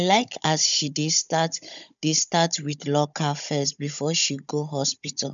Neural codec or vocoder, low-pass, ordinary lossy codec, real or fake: codec, 16 kHz, 16 kbps, FunCodec, trained on Chinese and English, 50 frames a second; 7.2 kHz; none; fake